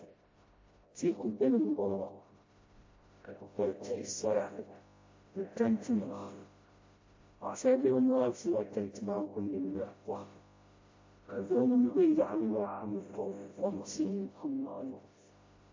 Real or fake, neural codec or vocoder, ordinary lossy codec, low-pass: fake; codec, 16 kHz, 0.5 kbps, FreqCodec, smaller model; MP3, 32 kbps; 7.2 kHz